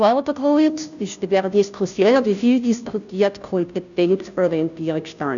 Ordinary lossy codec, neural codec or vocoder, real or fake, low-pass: none; codec, 16 kHz, 0.5 kbps, FunCodec, trained on Chinese and English, 25 frames a second; fake; 7.2 kHz